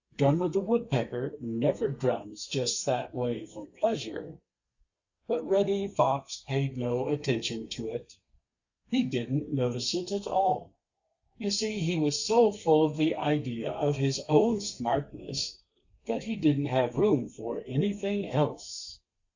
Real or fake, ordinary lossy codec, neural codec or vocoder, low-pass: fake; Opus, 64 kbps; codec, 32 kHz, 1.9 kbps, SNAC; 7.2 kHz